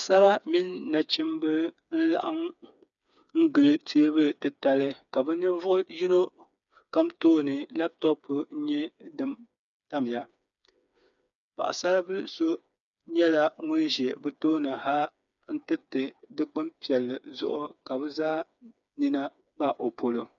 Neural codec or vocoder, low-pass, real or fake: codec, 16 kHz, 4 kbps, FreqCodec, smaller model; 7.2 kHz; fake